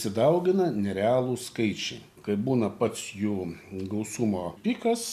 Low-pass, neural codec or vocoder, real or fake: 14.4 kHz; none; real